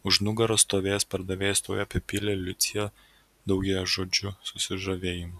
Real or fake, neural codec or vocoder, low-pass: real; none; 14.4 kHz